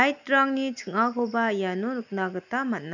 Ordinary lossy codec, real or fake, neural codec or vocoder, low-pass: none; real; none; 7.2 kHz